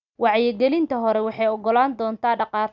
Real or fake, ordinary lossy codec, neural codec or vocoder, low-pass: real; none; none; 7.2 kHz